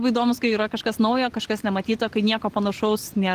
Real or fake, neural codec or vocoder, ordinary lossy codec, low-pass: real; none; Opus, 16 kbps; 14.4 kHz